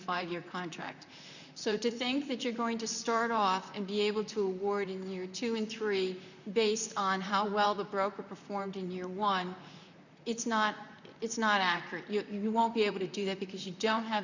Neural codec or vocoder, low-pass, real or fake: vocoder, 44.1 kHz, 128 mel bands, Pupu-Vocoder; 7.2 kHz; fake